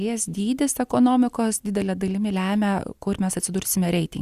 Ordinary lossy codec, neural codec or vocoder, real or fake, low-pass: Opus, 64 kbps; none; real; 14.4 kHz